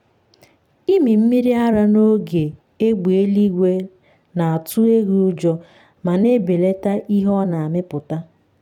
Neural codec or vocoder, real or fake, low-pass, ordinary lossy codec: vocoder, 44.1 kHz, 128 mel bands every 256 samples, BigVGAN v2; fake; 19.8 kHz; none